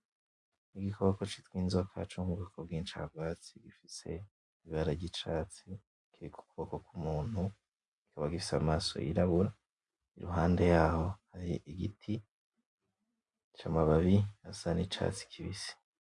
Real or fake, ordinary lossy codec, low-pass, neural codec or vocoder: real; MP3, 64 kbps; 10.8 kHz; none